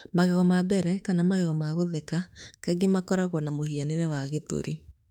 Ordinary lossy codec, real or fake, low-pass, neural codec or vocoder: none; fake; 19.8 kHz; autoencoder, 48 kHz, 32 numbers a frame, DAC-VAE, trained on Japanese speech